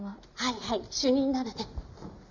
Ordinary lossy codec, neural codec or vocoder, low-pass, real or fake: none; none; 7.2 kHz; real